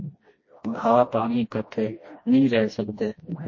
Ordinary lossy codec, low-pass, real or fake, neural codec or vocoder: MP3, 32 kbps; 7.2 kHz; fake; codec, 16 kHz, 1 kbps, FreqCodec, smaller model